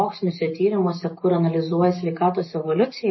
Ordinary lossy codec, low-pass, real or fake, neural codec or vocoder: MP3, 24 kbps; 7.2 kHz; real; none